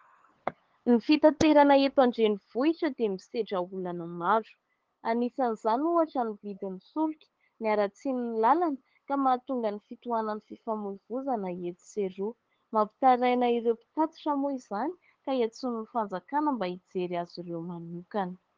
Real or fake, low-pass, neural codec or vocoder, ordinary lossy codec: fake; 7.2 kHz; codec, 16 kHz, 8 kbps, FunCodec, trained on LibriTTS, 25 frames a second; Opus, 16 kbps